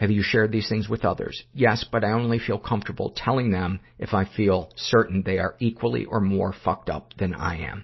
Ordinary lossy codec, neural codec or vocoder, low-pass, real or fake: MP3, 24 kbps; none; 7.2 kHz; real